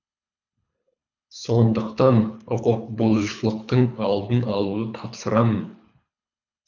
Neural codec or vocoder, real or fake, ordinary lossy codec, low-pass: codec, 24 kHz, 3 kbps, HILCodec; fake; none; 7.2 kHz